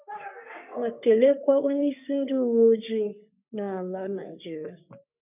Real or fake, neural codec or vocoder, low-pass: fake; codec, 44.1 kHz, 3.4 kbps, Pupu-Codec; 3.6 kHz